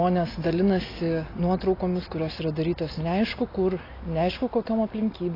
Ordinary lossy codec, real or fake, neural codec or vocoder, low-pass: AAC, 24 kbps; real; none; 5.4 kHz